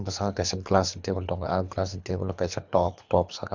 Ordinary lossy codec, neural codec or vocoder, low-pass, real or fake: none; codec, 24 kHz, 3 kbps, HILCodec; 7.2 kHz; fake